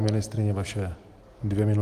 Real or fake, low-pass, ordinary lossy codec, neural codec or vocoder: real; 14.4 kHz; Opus, 24 kbps; none